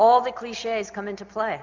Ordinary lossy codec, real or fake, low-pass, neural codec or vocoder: MP3, 64 kbps; real; 7.2 kHz; none